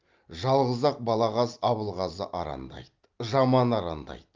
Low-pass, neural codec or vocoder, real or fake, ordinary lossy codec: 7.2 kHz; none; real; Opus, 24 kbps